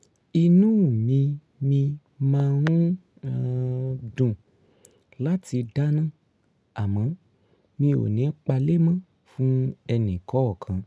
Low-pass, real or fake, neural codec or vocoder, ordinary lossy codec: none; real; none; none